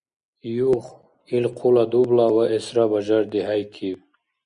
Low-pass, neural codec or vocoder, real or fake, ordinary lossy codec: 9.9 kHz; none; real; Opus, 64 kbps